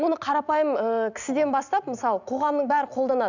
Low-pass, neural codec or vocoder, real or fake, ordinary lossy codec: 7.2 kHz; none; real; none